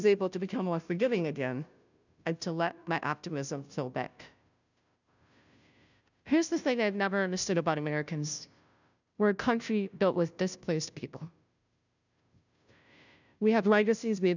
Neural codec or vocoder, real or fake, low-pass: codec, 16 kHz, 0.5 kbps, FunCodec, trained on Chinese and English, 25 frames a second; fake; 7.2 kHz